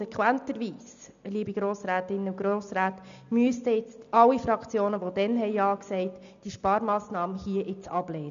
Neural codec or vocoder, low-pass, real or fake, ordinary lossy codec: none; 7.2 kHz; real; MP3, 96 kbps